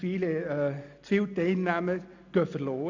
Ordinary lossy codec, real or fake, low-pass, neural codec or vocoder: AAC, 48 kbps; real; 7.2 kHz; none